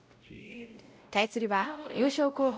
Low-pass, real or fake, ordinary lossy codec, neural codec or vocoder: none; fake; none; codec, 16 kHz, 0.5 kbps, X-Codec, WavLM features, trained on Multilingual LibriSpeech